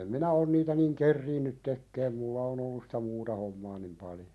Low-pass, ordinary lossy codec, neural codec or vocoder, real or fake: none; none; none; real